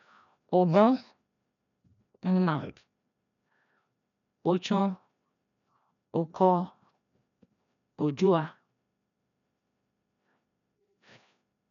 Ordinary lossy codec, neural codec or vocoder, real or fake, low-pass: none; codec, 16 kHz, 1 kbps, FreqCodec, larger model; fake; 7.2 kHz